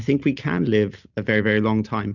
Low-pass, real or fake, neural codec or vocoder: 7.2 kHz; real; none